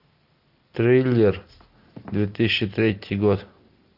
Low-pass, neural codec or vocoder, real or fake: 5.4 kHz; none; real